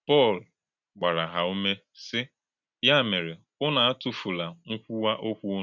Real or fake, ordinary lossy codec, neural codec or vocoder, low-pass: real; none; none; 7.2 kHz